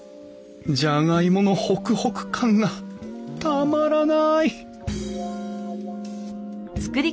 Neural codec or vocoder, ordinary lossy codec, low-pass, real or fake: none; none; none; real